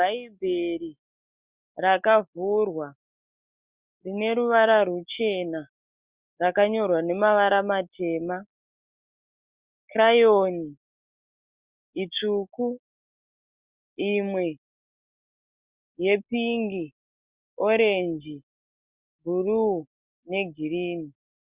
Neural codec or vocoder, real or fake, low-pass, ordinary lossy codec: none; real; 3.6 kHz; Opus, 64 kbps